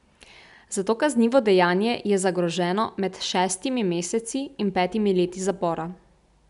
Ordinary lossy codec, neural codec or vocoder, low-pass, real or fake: none; none; 10.8 kHz; real